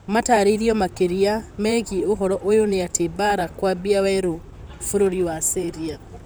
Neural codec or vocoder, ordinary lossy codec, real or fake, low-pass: vocoder, 44.1 kHz, 128 mel bands, Pupu-Vocoder; none; fake; none